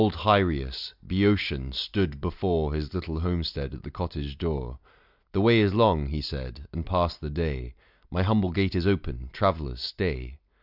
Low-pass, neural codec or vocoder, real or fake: 5.4 kHz; none; real